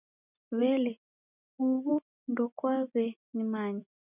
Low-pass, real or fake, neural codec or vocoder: 3.6 kHz; real; none